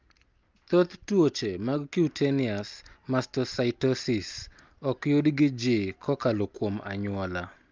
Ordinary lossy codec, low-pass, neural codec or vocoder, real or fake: Opus, 32 kbps; 7.2 kHz; none; real